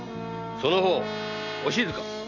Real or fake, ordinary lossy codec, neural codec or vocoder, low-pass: real; none; none; 7.2 kHz